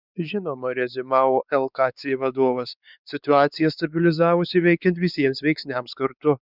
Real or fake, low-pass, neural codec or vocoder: fake; 5.4 kHz; codec, 16 kHz, 4 kbps, X-Codec, WavLM features, trained on Multilingual LibriSpeech